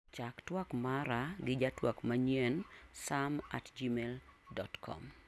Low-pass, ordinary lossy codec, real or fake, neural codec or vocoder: none; none; real; none